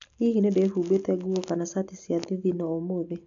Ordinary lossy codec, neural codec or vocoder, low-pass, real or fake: none; none; 7.2 kHz; real